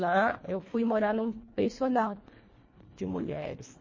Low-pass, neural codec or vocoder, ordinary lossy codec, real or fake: 7.2 kHz; codec, 24 kHz, 1.5 kbps, HILCodec; MP3, 32 kbps; fake